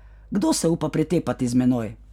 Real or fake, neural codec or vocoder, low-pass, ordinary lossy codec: real; none; 19.8 kHz; none